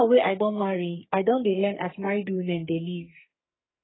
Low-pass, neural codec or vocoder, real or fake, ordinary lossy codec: 7.2 kHz; codec, 44.1 kHz, 2.6 kbps, SNAC; fake; AAC, 16 kbps